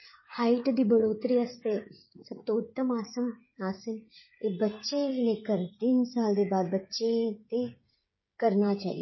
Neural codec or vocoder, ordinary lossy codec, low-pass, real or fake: codec, 16 kHz, 16 kbps, FreqCodec, smaller model; MP3, 24 kbps; 7.2 kHz; fake